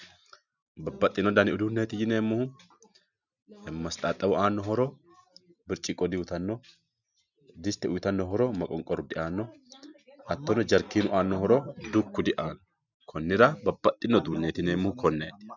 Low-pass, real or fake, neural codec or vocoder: 7.2 kHz; real; none